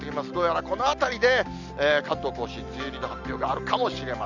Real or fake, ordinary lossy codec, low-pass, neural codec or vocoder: real; none; 7.2 kHz; none